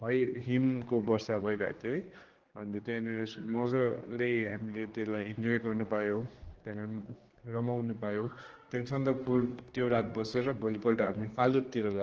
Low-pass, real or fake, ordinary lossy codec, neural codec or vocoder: 7.2 kHz; fake; Opus, 16 kbps; codec, 16 kHz, 2 kbps, X-Codec, HuBERT features, trained on general audio